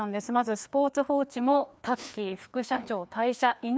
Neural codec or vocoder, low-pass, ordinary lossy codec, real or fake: codec, 16 kHz, 2 kbps, FreqCodec, larger model; none; none; fake